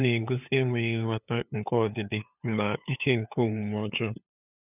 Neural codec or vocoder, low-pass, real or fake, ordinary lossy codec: codec, 16 kHz, 8 kbps, FunCodec, trained on LibriTTS, 25 frames a second; 3.6 kHz; fake; none